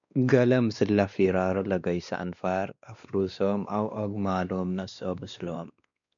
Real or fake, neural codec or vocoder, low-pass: fake; codec, 16 kHz, 2 kbps, X-Codec, WavLM features, trained on Multilingual LibriSpeech; 7.2 kHz